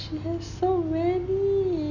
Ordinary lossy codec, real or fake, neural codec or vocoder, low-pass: none; real; none; 7.2 kHz